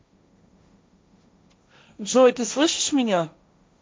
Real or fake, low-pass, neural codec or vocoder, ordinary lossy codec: fake; none; codec, 16 kHz, 1.1 kbps, Voila-Tokenizer; none